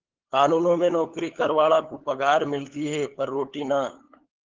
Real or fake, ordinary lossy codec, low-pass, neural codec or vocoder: fake; Opus, 16 kbps; 7.2 kHz; codec, 16 kHz, 8 kbps, FunCodec, trained on LibriTTS, 25 frames a second